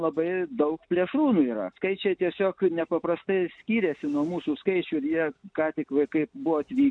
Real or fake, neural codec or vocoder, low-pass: real; none; 9.9 kHz